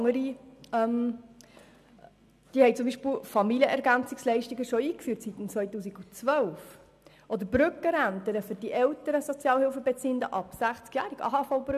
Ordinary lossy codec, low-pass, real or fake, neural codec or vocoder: none; 14.4 kHz; real; none